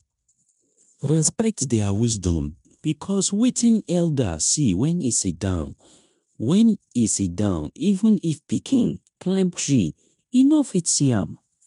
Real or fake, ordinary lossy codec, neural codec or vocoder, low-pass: fake; none; codec, 16 kHz in and 24 kHz out, 0.9 kbps, LongCat-Audio-Codec, fine tuned four codebook decoder; 10.8 kHz